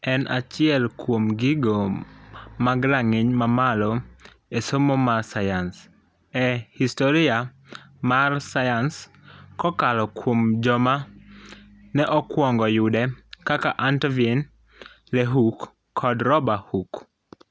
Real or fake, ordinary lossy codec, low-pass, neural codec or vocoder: real; none; none; none